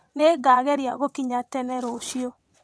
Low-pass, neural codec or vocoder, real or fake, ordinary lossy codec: none; vocoder, 22.05 kHz, 80 mel bands, WaveNeXt; fake; none